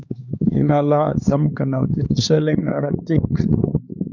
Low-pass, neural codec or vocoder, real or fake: 7.2 kHz; codec, 16 kHz, 2 kbps, X-Codec, HuBERT features, trained on LibriSpeech; fake